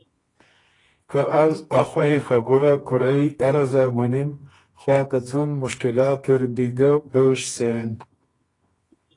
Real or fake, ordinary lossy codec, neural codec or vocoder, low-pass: fake; AAC, 32 kbps; codec, 24 kHz, 0.9 kbps, WavTokenizer, medium music audio release; 10.8 kHz